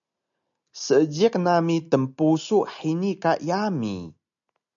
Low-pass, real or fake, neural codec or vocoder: 7.2 kHz; real; none